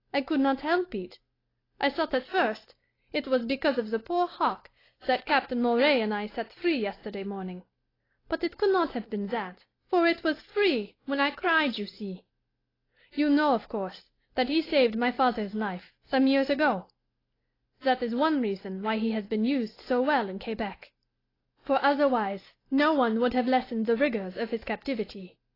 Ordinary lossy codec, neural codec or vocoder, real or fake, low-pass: AAC, 24 kbps; none; real; 5.4 kHz